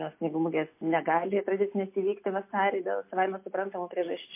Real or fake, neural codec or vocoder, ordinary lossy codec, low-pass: real; none; MP3, 24 kbps; 3.6 kHz